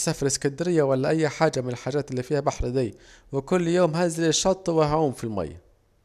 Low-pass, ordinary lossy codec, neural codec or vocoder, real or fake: 14.4 kHz; none; none; real